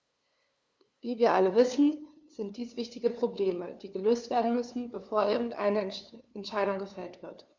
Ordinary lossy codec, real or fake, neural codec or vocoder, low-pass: none; fake; codec, 16 kHz, 2 kbps, FunCodec, trained on LibriTTS, 25 frames a second; none